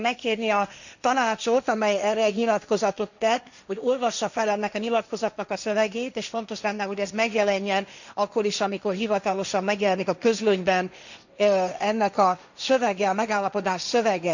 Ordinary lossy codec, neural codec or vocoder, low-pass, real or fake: none; codec, 16 kHz, 1.1 kbps, Voila-Tokenizer; 7.2 kHz; fake